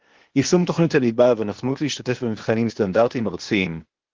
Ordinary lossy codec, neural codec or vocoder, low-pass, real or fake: Opus, 16 kbps; codec, 16 kHz, 0.8 kbps, ZipCodec; 7.2 kHz; fake